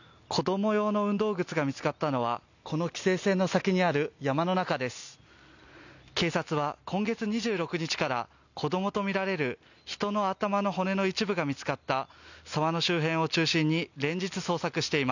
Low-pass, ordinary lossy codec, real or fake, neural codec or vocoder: 7.2 kHz; none; real; none